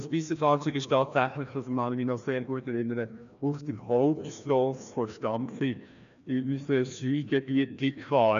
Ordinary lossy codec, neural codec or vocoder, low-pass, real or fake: none; codec, 16 kHz, 1 kbps, FreqCodec, larger model; 7.2 kHz; fake